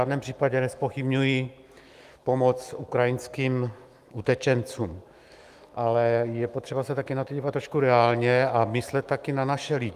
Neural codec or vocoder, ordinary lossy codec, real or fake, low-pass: autoencoder, 48 kHz, 128 numbers a frame, DAC-VAE, trained on Japanese speech; Opus, 32 kbps; fake; 14.4 kHz